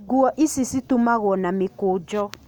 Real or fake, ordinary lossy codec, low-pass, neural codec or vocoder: real; none; 19.8 kHz; none